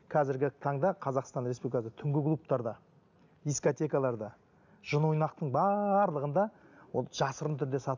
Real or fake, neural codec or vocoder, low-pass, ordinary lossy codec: real; none; 7.2 kHz; none